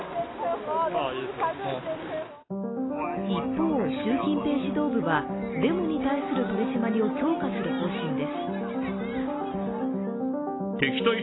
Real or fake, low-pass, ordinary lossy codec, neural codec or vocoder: real; 7.2 kHz; AAC, 16 kbps; none